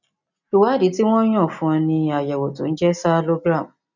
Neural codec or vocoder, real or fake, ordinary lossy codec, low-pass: none; real; none; 7.2 kHz